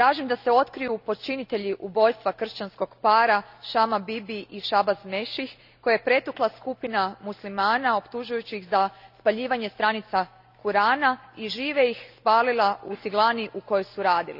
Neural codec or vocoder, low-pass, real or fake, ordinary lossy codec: none; 5.4 kHz; real; none